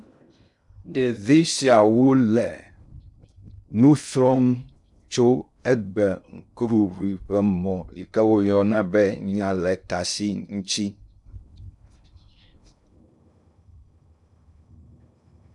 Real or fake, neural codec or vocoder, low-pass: fake; codec, 16 kHz in and 24 kHz out, 0.6 kbps, FocalCodec, streaming, 2048 codes; 10.8 kHz